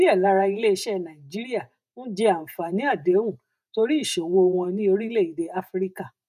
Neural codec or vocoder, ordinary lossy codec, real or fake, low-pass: vocoder, 48 kHz, 128 mel bands, Vocos; none; fake; 14.4 kHz